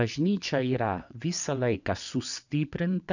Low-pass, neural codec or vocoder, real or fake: 7.2 kHz; vocoder, 22.05 kHz, 80 mel bands, WaveNeXt; fake